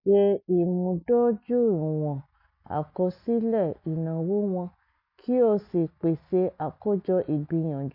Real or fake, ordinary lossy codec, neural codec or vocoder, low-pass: real; MP3, 32 kbps; none; 5.4 kHz